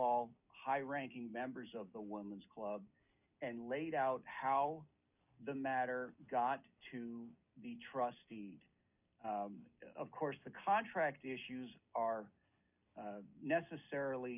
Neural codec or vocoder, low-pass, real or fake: none; 3.6 kHz; real